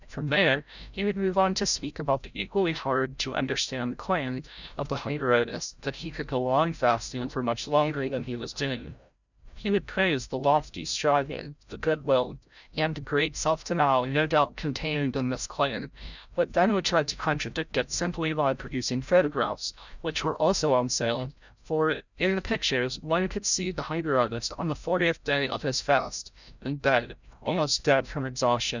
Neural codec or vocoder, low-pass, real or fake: codec, 16 kHz, 0.5 kbps, FreqCodec, larger model; 7.2 kHz; fake